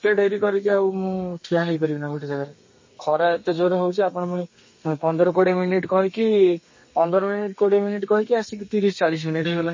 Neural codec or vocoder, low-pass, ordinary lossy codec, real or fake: codec, 32 kHz, 1.9 kbps, SNAC; 7.2 kHz; MP3, 32 kbps; fake